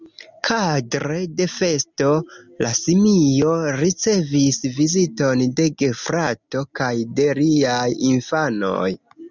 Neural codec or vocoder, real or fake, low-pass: none; real; 7.2 kHz